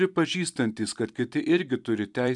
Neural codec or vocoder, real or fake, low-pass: vocoder, 44.1 kHz, 128 mel bands every 256 samples, BigVGAN v2; fake; 10.8 kHz